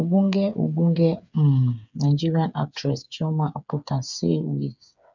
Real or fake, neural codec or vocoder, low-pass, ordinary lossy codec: fake; codec, 16 kHz, 8 kbps, FreqCodec, smaller model; 7.2 kHz; none